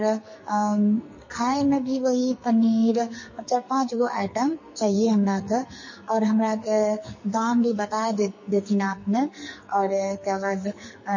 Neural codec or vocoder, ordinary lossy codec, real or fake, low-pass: codec, 44.1 kHz, 2.6 kbps, SNAC; MP3, 32 kbps; fake; 7.2 kHz